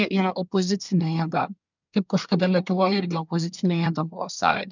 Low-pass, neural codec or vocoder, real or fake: 7.2 kHz; codec, 24 kHz, 1 kbps, SNAC; fake